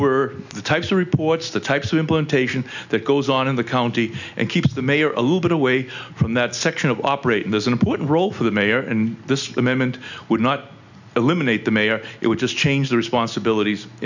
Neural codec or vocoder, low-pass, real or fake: none; 7.2 kHz; real